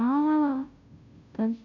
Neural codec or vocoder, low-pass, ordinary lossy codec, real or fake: codec, 16 kHz, 0.5 kbps, FunCodec, trained on Chinese and English, 25 frames a second; 7.2 kHz; none; fake